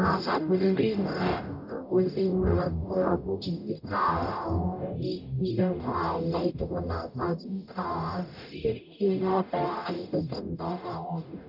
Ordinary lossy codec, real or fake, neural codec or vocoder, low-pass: none; fake; codec, 44.1 kHz, 0.9 kbps, DAC; 5.4 kHz